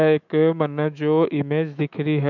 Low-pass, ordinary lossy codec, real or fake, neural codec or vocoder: 7.2 kHz; none; fake; autoencoder, 48 kHz, 128 numbers a frame, DAC-VAE, trained on Japanese speech